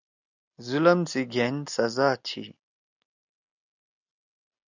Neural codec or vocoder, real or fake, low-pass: none; real; 7.2 kHz